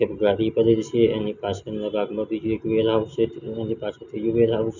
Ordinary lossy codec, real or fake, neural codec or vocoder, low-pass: none; real; none; 7.2 kHz